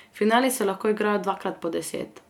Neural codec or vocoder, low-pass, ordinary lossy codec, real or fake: none; 19.8 kHz; none; real